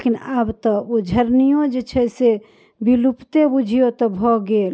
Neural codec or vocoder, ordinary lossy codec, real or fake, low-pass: none; none; real; none